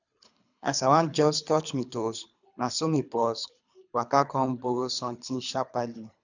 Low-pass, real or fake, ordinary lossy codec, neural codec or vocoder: 7.2 kHz; fake; none; codec, 24 kHz, 3 kbps, HILCodec